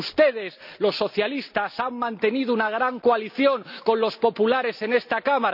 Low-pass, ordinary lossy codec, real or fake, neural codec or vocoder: 5.4 kHz; none; real; none